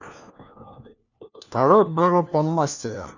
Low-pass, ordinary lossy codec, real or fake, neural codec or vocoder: 7.2 kHz; none; fake; codec, 16 kHz, 1 kbps, FunCodec, trained on LibriTTS, 50 frames a second